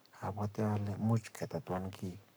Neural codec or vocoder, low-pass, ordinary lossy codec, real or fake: codec, 44.1 kHz, 7.8 kbps, Pupu-Codec; none; none; fake